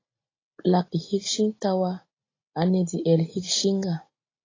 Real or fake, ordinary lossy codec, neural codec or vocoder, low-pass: real; AAC, 32 kbps; none; 7.2 kHz